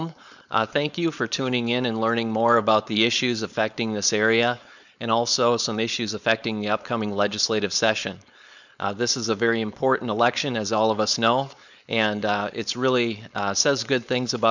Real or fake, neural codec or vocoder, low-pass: fake; codec, 16 kHz, 4.8 kbps, FACodec; 7.2 kHz